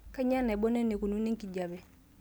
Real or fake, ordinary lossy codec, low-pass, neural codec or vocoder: real; none; none; none